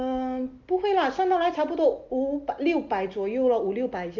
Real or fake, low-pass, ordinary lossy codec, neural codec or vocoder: real; 7.2 kHz; Opus, 32 kbps; none